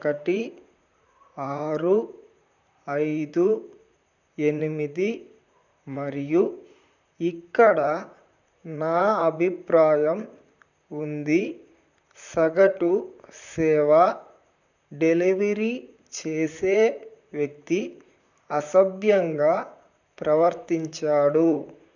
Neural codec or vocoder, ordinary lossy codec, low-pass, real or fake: vocoder, 44.1 kHz, 128 mel bands, Pupu-Vocoder; none; 7.2 kHz; fake